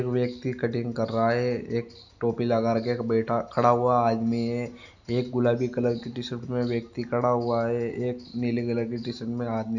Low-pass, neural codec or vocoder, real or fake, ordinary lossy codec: 7.2 kHz; none; real; none